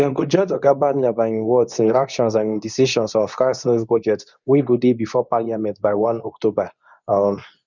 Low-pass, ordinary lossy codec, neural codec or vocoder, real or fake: 7.2 kHz; none; codec, 24 kHz, 0.9 kbps, WavTokenizer, medium speech release version 2; fake